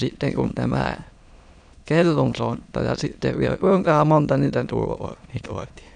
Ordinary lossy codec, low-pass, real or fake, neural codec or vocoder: none; 9.9 kHz; fake; autoencoder, 22.05 kHz, a latent of 192 numbers a frame, VITS, trained on many speakers